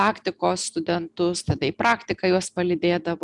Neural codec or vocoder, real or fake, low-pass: none; real; 10.8 kHz